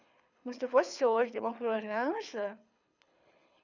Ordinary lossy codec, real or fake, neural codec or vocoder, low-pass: none; fake; codec, 24 kHz, 6 kbps, HILCodec; 7.2 kHz